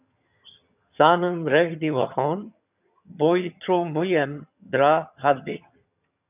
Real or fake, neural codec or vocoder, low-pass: fake; vocoder, 22.05 kHz, 80 mel bands, HiFi-GAN; 3.6 kHz